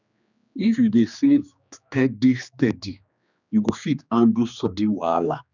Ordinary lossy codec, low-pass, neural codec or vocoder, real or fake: none; 7.2 kHz; codec, 16 kHz, 2 kbps, X-Codec, HuBERT features, trained on general audio; fake